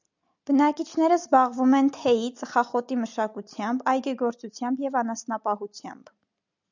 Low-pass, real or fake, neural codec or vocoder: 7.2 kHz; real; none